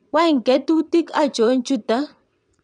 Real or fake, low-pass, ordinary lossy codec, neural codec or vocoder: fake; 9.9 kHz; none; vocoder, 22.05 kHz, 80 mel bands, WaveNeXt